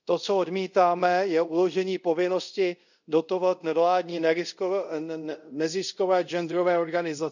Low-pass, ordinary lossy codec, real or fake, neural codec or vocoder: 7.2 kHz; none; fake; codec, 24 kHz, 0.5 kbps, DualCodec